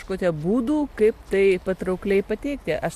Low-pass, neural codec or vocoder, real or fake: 14.4 kHz; none; real